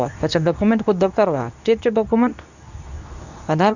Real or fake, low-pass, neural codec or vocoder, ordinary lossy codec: fake; 7.2 kHz; codec, 24 kHz, 0.9 kbps, WavTokenizer, medium speech release version 2; none